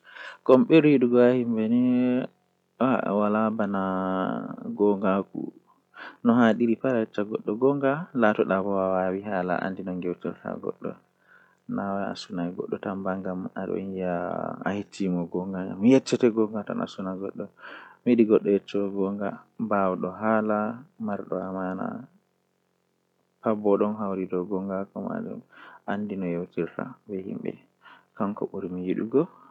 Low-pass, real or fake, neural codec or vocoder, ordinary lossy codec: 19.8 kHz; real; none; none